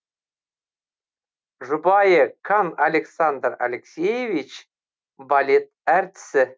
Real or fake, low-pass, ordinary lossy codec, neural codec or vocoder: real; none; none; none